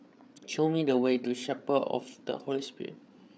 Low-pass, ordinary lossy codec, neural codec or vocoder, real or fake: none; none; codec, 16 kHz, 8 kbps, FreqCodec, larger model; fake